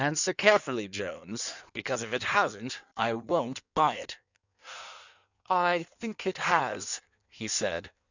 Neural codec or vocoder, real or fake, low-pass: codec, 16 kHz in and 24 kHz out, 1.1 kbps, FireRedTTS-2 codec; fake; 7.2 kHz